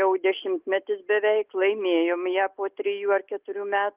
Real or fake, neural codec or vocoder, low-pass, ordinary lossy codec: real; none; 3.6 kHz; Opus, 24 kbps